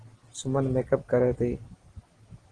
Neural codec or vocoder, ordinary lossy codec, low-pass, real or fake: none; Opus, 16 kbps; 9.9 kHz; real